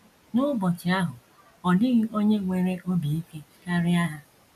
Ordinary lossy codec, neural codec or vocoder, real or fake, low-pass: none; none; real; 14.4 kHz